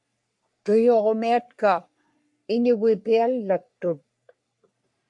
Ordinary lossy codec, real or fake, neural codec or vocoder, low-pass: MP3, 64 kbps; fake; codec, 44.1 kHz, 3.4 kbps, Pupu-Codec; 10.8 kHz